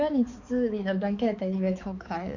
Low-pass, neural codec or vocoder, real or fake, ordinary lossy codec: 7.2 kHz; codec, 16 kHz, 4 kbps, X-Codec, HuBERT features, trained on balanced general audio; fake; none